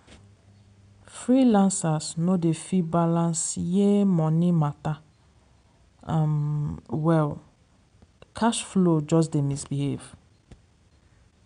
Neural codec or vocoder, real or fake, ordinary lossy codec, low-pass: none; real; none; 9.9 kHz